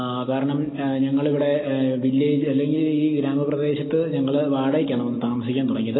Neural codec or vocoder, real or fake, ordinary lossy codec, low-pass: none; real; AAC, 16 kbps; 7.2 kHz